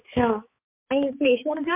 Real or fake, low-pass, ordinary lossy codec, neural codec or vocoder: fake; 3.6 kHz; MP3, 32 kbps; codec, 16 kHz, 4 kbps, X-Codec, HuBERT features, trained on balanced general audio